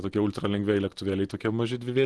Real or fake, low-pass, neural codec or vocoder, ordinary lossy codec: real; 10.8 kHz; none; Opus, 16 kbps